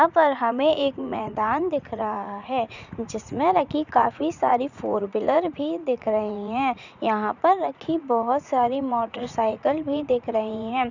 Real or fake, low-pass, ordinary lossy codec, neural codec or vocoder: fake; 7.2 kHz; none; vocoder, 44.1 kHz, 80 mel bands, Vocos